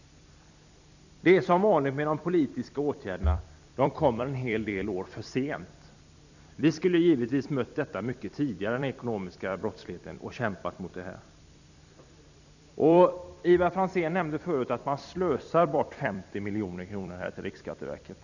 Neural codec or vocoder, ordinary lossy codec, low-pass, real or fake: vocoder, 44.1 kHz, 128 mel bands every 256 samples, BigVGAN v2; none; 7.2 kHz; fake